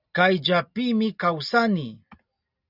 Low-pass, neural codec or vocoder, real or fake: 5.4 kHz; none; real